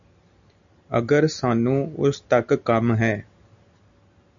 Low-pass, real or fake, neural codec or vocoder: 7.2 kHz; real; none